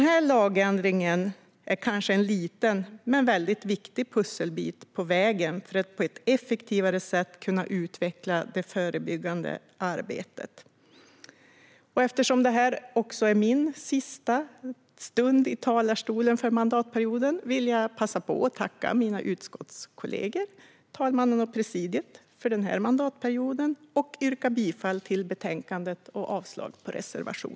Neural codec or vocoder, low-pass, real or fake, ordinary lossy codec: none; none; real; none